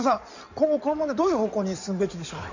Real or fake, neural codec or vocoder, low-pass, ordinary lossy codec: fake; codec, 16 kHz in and 24 kHz out, 2.2 kbps, FireRedTTS-2 codec; 7.2 kHz; none